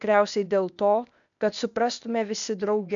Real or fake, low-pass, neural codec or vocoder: fake; 7.2 kHz; codec, 16 kHz, 0.8 kbps, ZipCodec